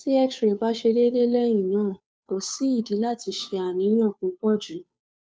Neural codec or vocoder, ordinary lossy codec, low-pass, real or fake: codec, 16 kHz, 2 kbps, FunCodec, trained on Chinese and English, 25 frames a second; none; none; fake